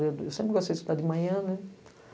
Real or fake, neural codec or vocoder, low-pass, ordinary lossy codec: real; none; none; none